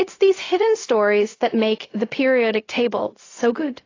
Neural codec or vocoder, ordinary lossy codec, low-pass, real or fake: codec, 16 kHz, 0.4 kbps, LongCat-Audio-Codec; AAC, 32 kbps; 7.2 kHz; fake